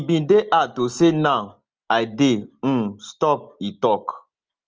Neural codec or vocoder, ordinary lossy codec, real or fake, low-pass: none; Opus, 24 kbps; real; 7.2 kHz